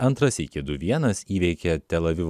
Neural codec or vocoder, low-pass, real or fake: none; 14.4 kHz; real